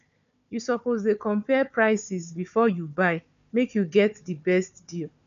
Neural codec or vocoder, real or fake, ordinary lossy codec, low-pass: codec, 16 kHz, 4 kbps, FunCodec, trained on Chinese and English, 50 frames a second; fake; none; 7.2 kHz